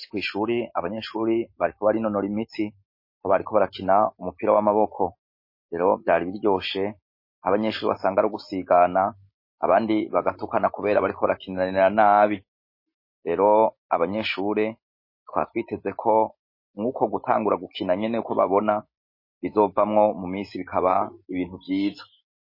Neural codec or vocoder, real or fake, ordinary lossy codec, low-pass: none; real; MP3, 24 kbps; 5.4 kHz